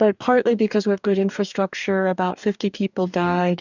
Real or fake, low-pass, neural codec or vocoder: fake; 7.2 kHz; codec, 44.1 kHz, 2.6 kbps, DAC